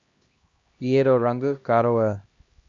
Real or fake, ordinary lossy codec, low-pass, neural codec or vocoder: fake; Opus, 64 kbps; 7.2 kHz; codec, 16 kHz, 1 kbps, X-Codec, HuBERT features, trained on LibriSpeech